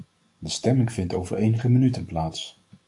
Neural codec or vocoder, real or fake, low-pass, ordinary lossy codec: codec, 44.1 kHz, 7.8 kbps, DAC; fake; 10.8 kHz; AAC, 64 kbps